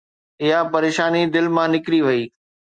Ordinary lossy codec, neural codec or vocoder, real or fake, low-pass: Opus, 64 kbps; none; real; 9.9 kHz